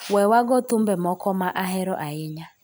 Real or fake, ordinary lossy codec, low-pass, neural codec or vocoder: real; none; none; none